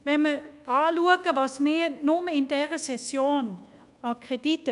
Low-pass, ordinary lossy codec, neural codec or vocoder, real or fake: 10.8 kHz; none; codec, 24 kHz, 1.2 kbps, DualCodec; fake